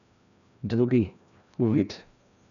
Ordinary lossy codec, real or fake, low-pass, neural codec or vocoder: none; fake; 7.2 kHz; codec, 16 kHz, 1 kbps, FreqCodec, larger model